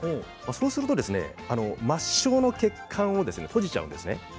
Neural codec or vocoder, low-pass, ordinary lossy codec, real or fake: none; none; none; real